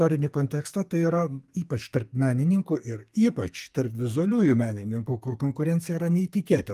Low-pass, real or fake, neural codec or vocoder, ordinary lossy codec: 14.4 kHz; fake; codec, 44.1 kHz, 2.6 kbps, SNAC; Opus, 32 kbps